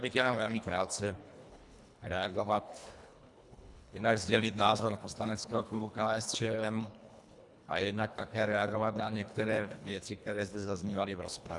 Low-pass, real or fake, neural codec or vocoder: 10.8 kHz; fake; codec, 24 kHz, 1.5 kbps, HILCodec